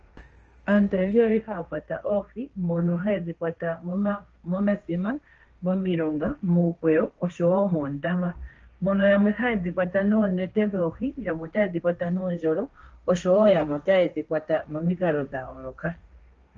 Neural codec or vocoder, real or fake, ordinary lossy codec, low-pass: codec, 16 kHz, 1.1 kbps, Voila-Tokenizer; fake; Opus, 24 kbps; 7.2 kHz